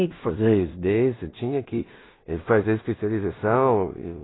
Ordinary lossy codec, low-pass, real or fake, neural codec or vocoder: AAC, 16 kbps; 7.2 kHz; fake; codec, 16 kHz in and 24 kHz out, 0.4 kbps, LongCat-Audio-Codec, two codebook decoder